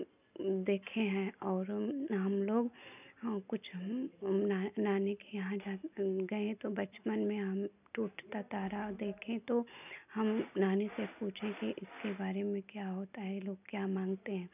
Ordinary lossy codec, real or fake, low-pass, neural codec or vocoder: none; real; 3.6 kHz; none